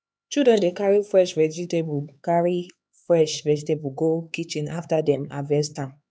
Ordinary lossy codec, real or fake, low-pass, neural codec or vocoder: none; fake; none; codec, 16 kHz, 4 kbps, X-Codec, HuBERT features, trained on LibriSpeech